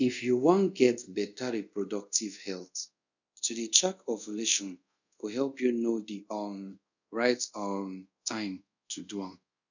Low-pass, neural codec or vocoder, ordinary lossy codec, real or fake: 7.2 kHz; codec, 24 kHz, 0.5 kbps, DualCodec; none; fake